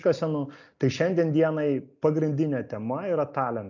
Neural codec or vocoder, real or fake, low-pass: none; real; 7.2 kHz